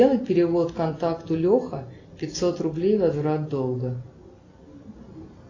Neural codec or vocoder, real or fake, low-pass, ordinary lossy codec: none; real; 7.2 kHz; AAC, 32 kbps